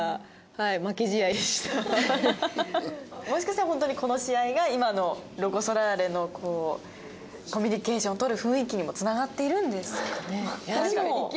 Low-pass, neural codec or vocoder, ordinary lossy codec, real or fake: none; none; none; real